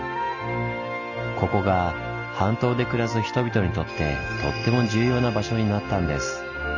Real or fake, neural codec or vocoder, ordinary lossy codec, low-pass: real; none; none; 7.2 kHz